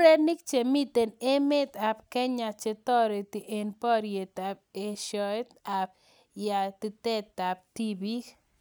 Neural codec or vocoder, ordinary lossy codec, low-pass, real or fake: none; none; none; real